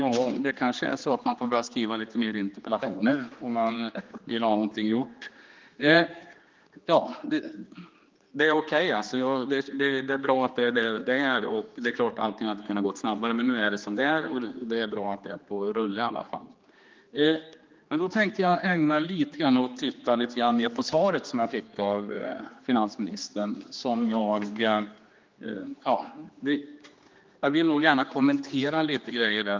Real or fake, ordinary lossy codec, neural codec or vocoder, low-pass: fake; Opus, 32 kbps; codec, 16 kHz, 2 kbps, X-Codec, HuBERT features, trained on general audio; 7.2 kHz